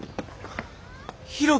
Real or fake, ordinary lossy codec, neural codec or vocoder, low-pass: real; none; none; none